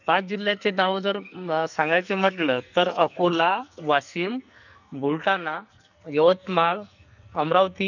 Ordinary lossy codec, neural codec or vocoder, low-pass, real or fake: none; codec, 44.1 kHz, 2.6 kbps, SNAC; 7.2 kHz; fake